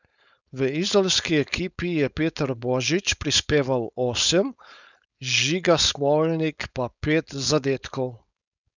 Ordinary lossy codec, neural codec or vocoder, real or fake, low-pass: none; codec, 16 kHz, 4.8 kbps, FACodec; fake; 7.2 kHz